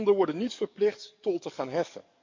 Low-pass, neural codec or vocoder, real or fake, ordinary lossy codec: 7.2 kHz; codec, 44.1 kHz, 7.8 kbps, DAC; fake; MP3, 48 kbps